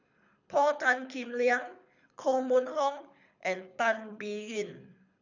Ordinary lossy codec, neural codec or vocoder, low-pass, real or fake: none; codec, 24 kHz, 6 kbps, HILCodec; 7.2 kHz; fake